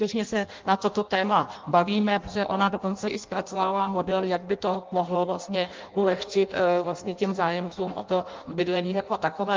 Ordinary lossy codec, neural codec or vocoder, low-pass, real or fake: Opus, 24 kbps; codec, 16 kHz in and 24 kHz out, 0.6 kbps, FireRedTTS-2 codec; 7.2 kHz; fake